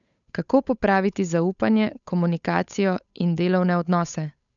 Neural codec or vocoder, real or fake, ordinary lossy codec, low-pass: codec, 16 kHz, 8 kbps, FunCodec, trained on Chinese and English, 25 frames a second; fake; none; 7.2 kHz